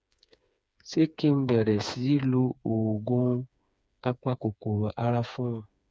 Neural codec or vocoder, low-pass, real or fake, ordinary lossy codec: codec, 16 kHz, 4 kbps, FreqCodec, smaller model; none; fake; none